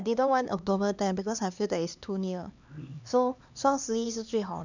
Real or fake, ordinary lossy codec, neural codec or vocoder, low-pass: fake; none; codec, 16 kHz, 2 kbps, X-Codec, HuBERT features, trained on LibriSpeech; 7.2 kHz